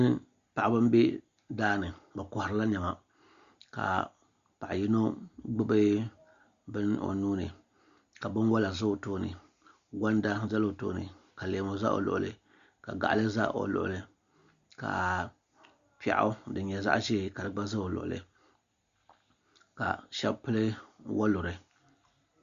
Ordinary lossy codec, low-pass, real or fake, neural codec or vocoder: Opus, 64 kbps; 7.2 kHz; real; none